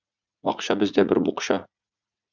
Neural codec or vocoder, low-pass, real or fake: vocoder, 44.1 kHz, 80 mel bands, Vocos; 7.2 kHz; fake